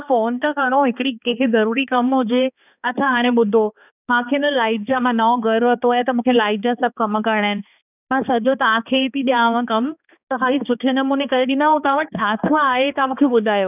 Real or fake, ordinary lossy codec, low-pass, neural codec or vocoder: fake; none; 3.6 kHz; codec, 16 kHz, 2 kbps, X-Codec, HuBERT features, trained on balanced general audio